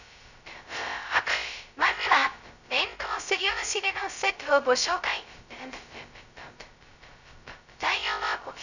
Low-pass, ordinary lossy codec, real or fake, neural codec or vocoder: 7.2 kHz; none; fake; codec, 16 kHz, 0.2 kbps, FocalCodec